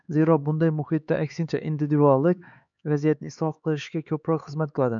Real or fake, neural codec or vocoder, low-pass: fake; codec, 16 kHz, 4 kbps, X-Codec, HuBERT features, trained on LibriSpeech; 7.2 kHz